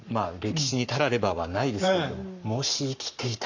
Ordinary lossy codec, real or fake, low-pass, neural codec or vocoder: none; fake; 7.2 kHz; codec, 44.1 kHz, 7.8 kbps, Pupu-Codec